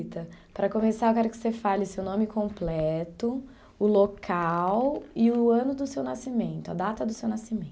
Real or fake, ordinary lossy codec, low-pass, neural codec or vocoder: real; none; none; none